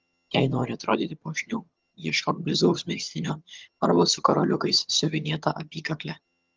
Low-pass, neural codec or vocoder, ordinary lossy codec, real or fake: 7.2 kHz; vocoder, 22.05 kHz, 80 mel bands, HiFi-GAN; Opus, 32 kbps; fake